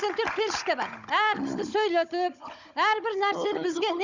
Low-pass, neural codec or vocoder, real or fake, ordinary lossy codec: 7.2 kHz; codec, 16 kHz, 16 kbps, FunCodec, trained on Chinese and English, 50 frames a second; fake; none